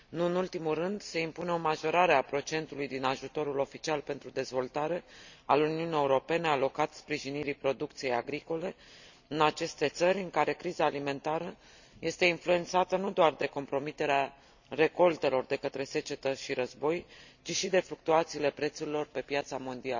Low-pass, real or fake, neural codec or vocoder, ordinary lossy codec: 7.2 kHz; real; none; none